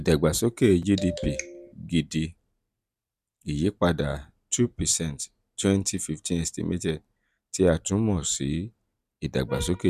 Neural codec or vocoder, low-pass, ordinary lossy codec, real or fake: none; 14.4 kHz; none; real